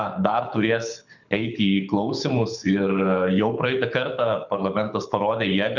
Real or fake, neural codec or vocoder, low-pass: fake; codec, 24 kHz, 6 kbps, HILCodec; 7.2 kHz